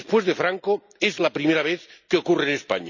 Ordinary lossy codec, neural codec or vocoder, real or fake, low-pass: none; none; real; 7.2 kHz